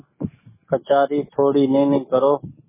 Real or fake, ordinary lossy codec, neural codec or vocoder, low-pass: fake; MP3, 16 kbps; codec, 44.1 kHz, 7.8 kbps, DAC; 3.6 kHz